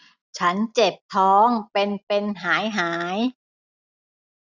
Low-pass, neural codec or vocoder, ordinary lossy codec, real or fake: 7.2 kHz; none; none; real